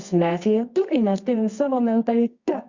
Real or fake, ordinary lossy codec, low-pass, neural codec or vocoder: fake; Opus, 64 kbps; 7.2 kHz; codec, 24 kHz, 0.9 kbps, WavTokenizer, medium music audio release